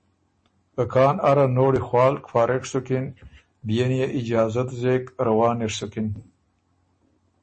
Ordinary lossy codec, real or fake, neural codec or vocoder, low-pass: MP3, 32 kbps; real; none; 10.8 kHz